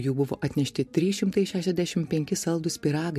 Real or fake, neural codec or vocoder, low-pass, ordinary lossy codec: real; none; 14.4 kHz; MP3, 64 kbps